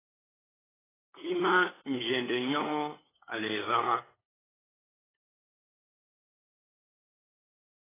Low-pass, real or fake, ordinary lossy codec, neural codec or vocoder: 3.6 kHz; fake; AAC, 16 kbps; codec, 16 kHz, 8 kbps, FunCodec, trained on LibriTTS, 25 frames a second